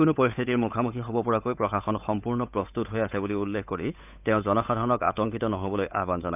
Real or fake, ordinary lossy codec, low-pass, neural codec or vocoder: fake; none; 3.6 kHz; codec, 16 kHz, 8 kbps, FunCodec, trained on Chinese and English, 25 frames a second